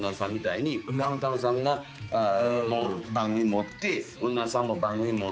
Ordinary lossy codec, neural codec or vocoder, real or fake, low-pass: none; codec, 16 kHz, 4 kbps, X-Codec, HuBERT features, trained on balanced general audio; fake; none